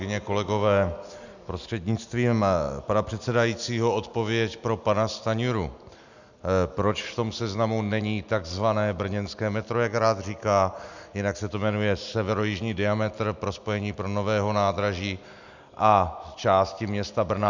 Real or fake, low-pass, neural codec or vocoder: real; 7.2 kHz; none